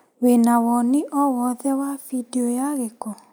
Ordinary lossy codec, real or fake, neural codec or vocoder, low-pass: none; real; none; none